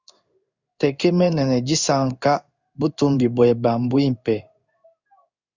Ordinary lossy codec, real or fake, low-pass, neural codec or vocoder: Opus, 64 kbps; fake; 7.2 kHz; codec, 16 kHz in and 24 kHz out, 1 kbps, XY-Tokenizer